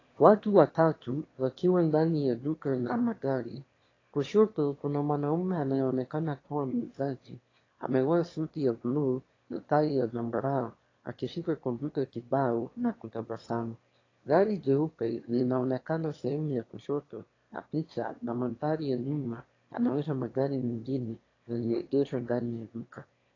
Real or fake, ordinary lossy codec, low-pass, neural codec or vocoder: fake; AAC, 32 kbps; 7.2 kHz; autoencoder, 22.05 kHz, a latent of 192 numbers a frame, VITS, trained on one speaker